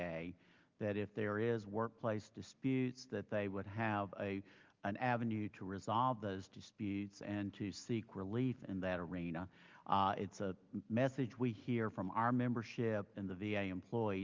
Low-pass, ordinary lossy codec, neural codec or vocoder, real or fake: 7.2 kHz; Opus, 32 kbps; none; real